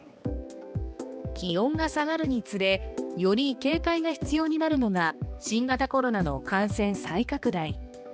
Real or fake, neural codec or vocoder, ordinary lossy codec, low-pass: fake; codec, 16 kHz, 2 kbps, X-Codec, HuBERT features, trained on balanced general audio; none; none